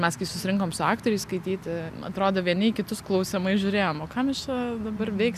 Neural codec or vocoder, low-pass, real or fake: none; 14.4 kHz; real